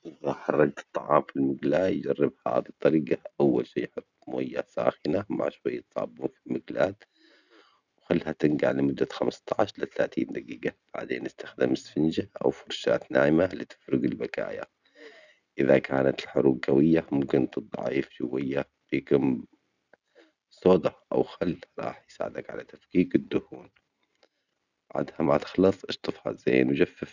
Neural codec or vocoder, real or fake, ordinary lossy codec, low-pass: none; real; none; 7.2 kHz